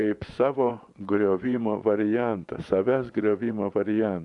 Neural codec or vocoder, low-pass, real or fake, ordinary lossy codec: vocoder, 24 kHz, 100 mel bands, Vocos; 10.8 kHz; fake; MP3, 96 kbps